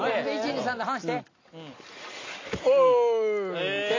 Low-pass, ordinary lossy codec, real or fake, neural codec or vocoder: 7.2 kHz; AAC, 32 kbps; real; none